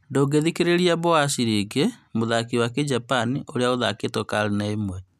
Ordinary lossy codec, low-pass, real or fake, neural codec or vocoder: none; 14.4 kHz; real; none